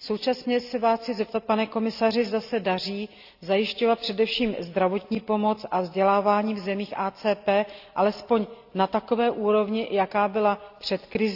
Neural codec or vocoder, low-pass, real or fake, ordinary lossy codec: none; 5.4 kHz; real; AAC, 48 kbps